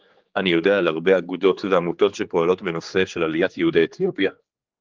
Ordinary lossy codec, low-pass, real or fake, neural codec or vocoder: Opus, 32 kbps; 7.2 kHz; fake; codec, 16 kHz, 4 kbps, X-Codec, HuBERT features, trained on general audio